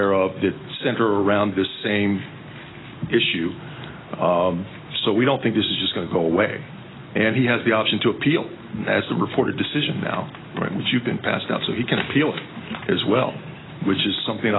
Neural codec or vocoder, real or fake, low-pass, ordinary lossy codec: none; real; 7.2 kHz; AAC, 16 kbps